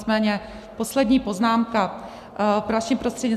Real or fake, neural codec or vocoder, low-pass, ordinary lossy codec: real; none; 14.4 kHz; AAC, 96 kbps